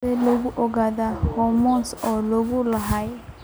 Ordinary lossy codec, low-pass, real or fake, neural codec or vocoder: none; none; real; none